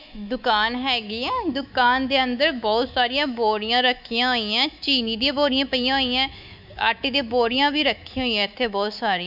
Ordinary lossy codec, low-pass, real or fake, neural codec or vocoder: none; 5.4 kHz; fake; codec, 24 kHz, 3.1 kbps, DualCodec